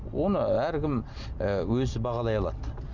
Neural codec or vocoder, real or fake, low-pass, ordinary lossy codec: none; real; 7.2 kHz; none